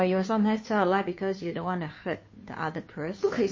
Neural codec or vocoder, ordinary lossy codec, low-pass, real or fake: codec, 16 kHz, 2 kbps, FunCodec, trained on Chinese and English, 25 frames a second; MP3, 32 kbps; 7.2 kHz; fake